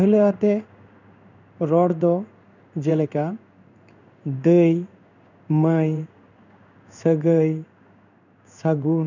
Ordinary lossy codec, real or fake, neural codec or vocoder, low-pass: none; fake; codec, 16 kHz in and 24 kHz out, 1 kbps, XY-Tokenizer; 7.2 kHz